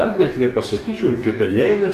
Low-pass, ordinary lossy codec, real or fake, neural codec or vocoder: 14.4 kHz; AAC, 64 kbps; fake; codec, 44.1 kHz, 2.6 kbps, DAC